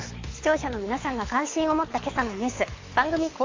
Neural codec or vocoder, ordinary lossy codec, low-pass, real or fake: codec, 24 kHz, 6 kbps, HILCodec; AAC, 32 kbps; 7.2 kHz; fake